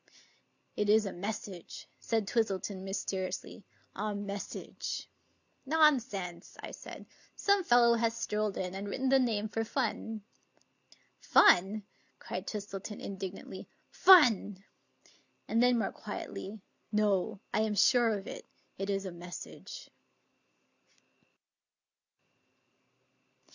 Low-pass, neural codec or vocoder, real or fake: 7.2 kHz; none; real